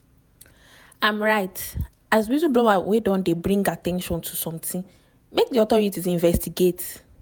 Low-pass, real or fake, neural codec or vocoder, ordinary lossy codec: none; fake; vocoder, 48 kHz, 128 mel bands, Vocos; none